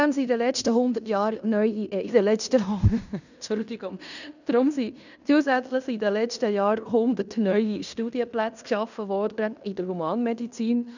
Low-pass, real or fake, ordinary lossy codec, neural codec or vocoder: 7.2 kHz; fake; none; codec, 16 kHz in and 24 kHz out, 0.9 kbps, LongCat-Audio-Codec, fine tuned four codebook decoder